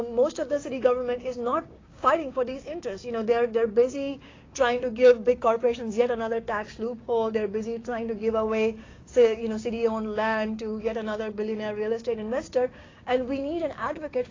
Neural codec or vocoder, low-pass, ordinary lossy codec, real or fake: codec, 16 kHz, 6 kbps, DAC; 7.2 kHz; AAC, 32 kbps; fake